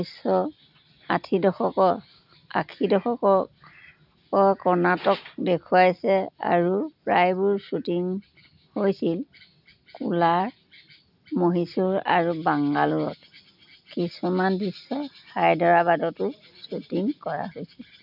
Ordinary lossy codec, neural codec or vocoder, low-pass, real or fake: none; none; 5.4 kHz; real